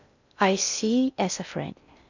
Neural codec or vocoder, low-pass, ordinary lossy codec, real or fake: codec, 16 kHz in and 24 kHz out, 0.6 kbps, FocalCodec, streaming, 2048 codes; 7.2 kHz; none; fake